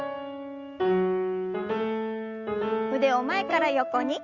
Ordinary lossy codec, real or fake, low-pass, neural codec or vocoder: Opus, 64 kbps; real; 7.2 kHz; none